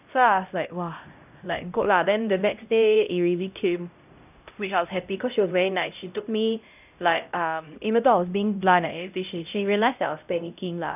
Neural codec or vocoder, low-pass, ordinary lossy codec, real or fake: codec, 16 kHz, 0.5 kbps, X-Codec, HuBERT features, trained on LibriSpeech; 3.6 kHz; none; fake